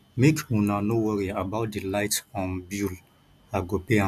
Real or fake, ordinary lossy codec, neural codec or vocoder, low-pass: real; none; none; 14.4 kHz